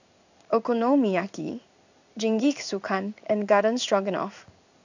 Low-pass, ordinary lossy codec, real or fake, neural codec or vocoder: 7.2 kHz; none; fake; codec, 16 kHz in and 24 kHz out, 1 kbps, XY-Tokenizer